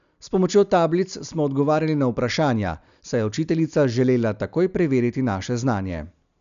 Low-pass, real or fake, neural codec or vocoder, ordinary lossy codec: 7.2 kHz; real; none; none